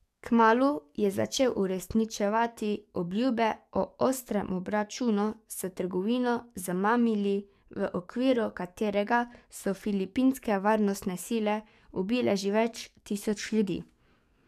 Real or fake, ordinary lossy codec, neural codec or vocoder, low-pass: fake; none; codec, 44.1 kHz, 7.8 kbps, DAC; 14.4 kHz